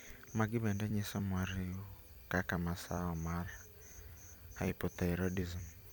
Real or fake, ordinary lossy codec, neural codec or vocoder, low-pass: fake; none; vocoder, 44.1 kHz, 128 mel bands every 256 samples, BigVGAN v2; none